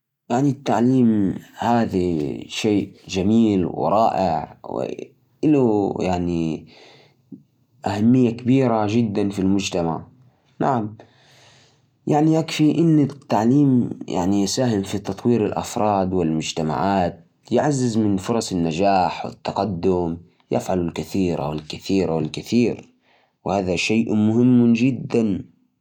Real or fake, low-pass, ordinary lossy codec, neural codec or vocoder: real; 19.8 kHz; none; none